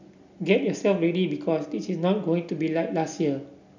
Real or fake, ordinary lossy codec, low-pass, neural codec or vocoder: real; none; 7.2 kHz; none